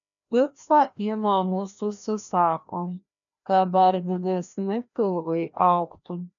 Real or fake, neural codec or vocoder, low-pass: fake; codec, 16 kHz, 1 kbps, FreqCodec, larger model; 7.2 kHz